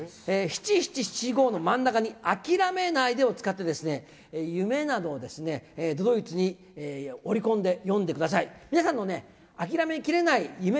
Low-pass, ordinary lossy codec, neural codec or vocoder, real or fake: none; none; none; real